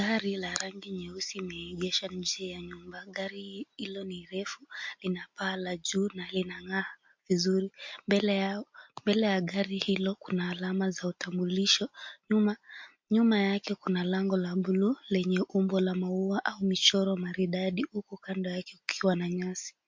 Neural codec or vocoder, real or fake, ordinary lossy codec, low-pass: none; real; MP3, 48 kbps; 7.2 kHz